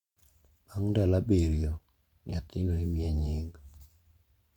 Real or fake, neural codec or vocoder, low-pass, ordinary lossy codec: fake; vocoder, 44.1 kHz, 128 mel bands every 512 samples, BigVGAN v2; 19.8 kHz; Opus, 64 kbps